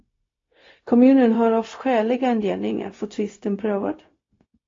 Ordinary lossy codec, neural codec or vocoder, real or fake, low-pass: AAC, 32 kbps; codec, 16 kHz, 0.4 kbps, LongCat-Audio-Codec; fake; 7.2 kHz